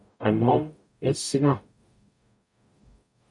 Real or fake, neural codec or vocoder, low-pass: fake; codec, 44.1 kHz, 0.9 kbps, DAC; 10.8 kHz